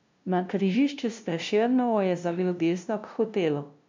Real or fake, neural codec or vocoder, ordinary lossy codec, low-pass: fake; codec, 16 kHz, 0.5 kbps, FunCodec, trained on LibriTTS, 25 frames a second; MP3, 64 kbps; 7.2 kHz